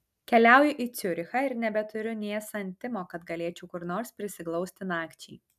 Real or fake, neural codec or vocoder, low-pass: real; none; 14.4 kHz